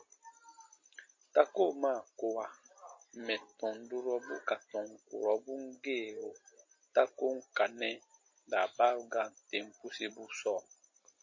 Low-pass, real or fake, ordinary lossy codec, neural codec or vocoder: 7.2 kHz; real; MP3, 32 kbps; none